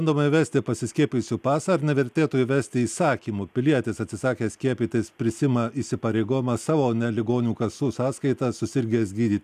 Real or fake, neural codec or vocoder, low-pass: real; none; 14.4 kHz